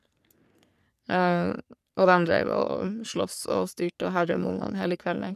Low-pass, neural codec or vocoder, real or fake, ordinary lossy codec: 14.4 kHz; codec, 44.1 kHz, 3.4 kbps, Pupu-Codec; fake; none